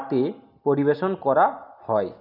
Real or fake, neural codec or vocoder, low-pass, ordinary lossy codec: real; none; 5.4 kHz; none